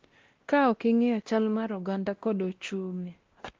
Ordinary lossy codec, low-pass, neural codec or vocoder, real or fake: Opus, 16 kbps; 7.2 kHz; codec, 16 kHz, 1 kbps, X-Codec, WavLM features, trained on Multilingual LibriSpeech; fake